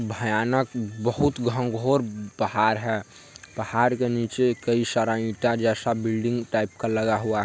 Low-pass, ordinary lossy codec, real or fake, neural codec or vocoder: none; none; real; none